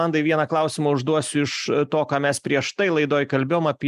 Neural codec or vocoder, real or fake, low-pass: none; real; 14.4 kHz